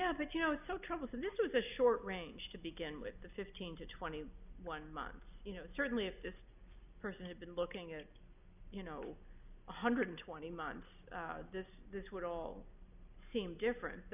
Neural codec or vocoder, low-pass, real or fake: none; 3.6 kHz; real